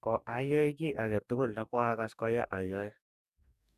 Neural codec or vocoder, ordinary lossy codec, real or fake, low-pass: codec, 44.1 kHz, 2.6 kbps, DAC; none; fake; 14.4 kHz